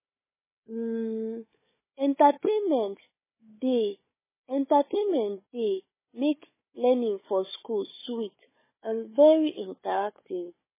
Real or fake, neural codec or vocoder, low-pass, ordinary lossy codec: fake; codec, 16 kHz, 4 kbps, FunCodec, trained on Chinese and English, 50 frames a second; 3.6 kHz; MP3, 16 kbps